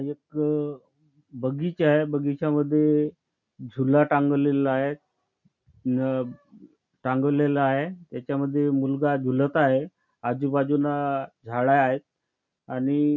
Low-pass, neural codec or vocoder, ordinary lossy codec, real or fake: 7.2 kHz; none; none; real